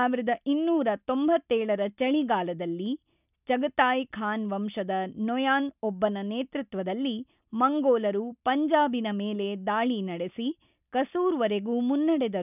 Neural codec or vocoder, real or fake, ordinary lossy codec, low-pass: none; real; none; 3.6 kHz